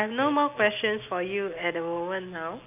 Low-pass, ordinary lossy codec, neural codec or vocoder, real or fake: 3.6 kHz; none; none; real